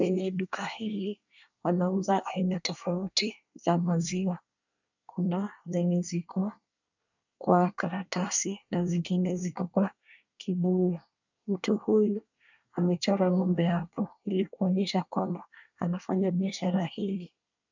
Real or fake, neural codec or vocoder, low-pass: fake; codec, 24 kHz, 1 kbps, SNAC; 7.2 kHz